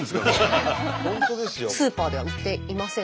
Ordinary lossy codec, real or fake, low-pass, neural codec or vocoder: none; real; none; none